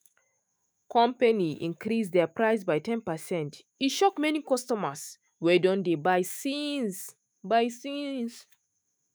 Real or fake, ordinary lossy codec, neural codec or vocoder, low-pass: fake; none; autoencoder, 48 kHz, 128 numbers a frame, DAC-VAE, trained on Japanese speech; none